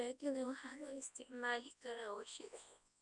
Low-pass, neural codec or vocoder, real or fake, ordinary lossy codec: 10.8 kHz; codec, 24 kHz, 0.9 kbps, WavTokenizer, large speech release; fake; none